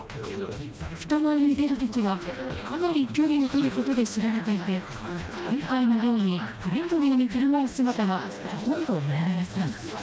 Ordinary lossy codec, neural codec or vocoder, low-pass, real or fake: none; codec, 16 kHz, 1 kbps, FreqCodec, smaller model; none; fake